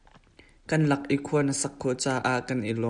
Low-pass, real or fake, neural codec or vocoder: 9.9 kHz; real; none